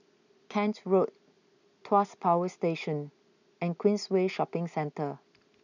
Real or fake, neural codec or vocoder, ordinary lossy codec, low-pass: real; none; none; 7.2 kHz